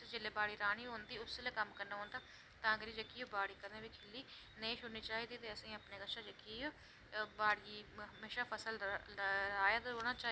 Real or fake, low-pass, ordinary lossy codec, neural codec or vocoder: real; none; none; none